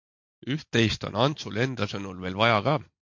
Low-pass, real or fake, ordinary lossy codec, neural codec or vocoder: 7.2 kHz; real; MP3, 48 kbps; none